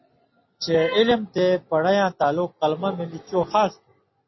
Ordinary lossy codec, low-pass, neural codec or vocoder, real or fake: MP3, 24 kbps; 7.2 kHz; none; real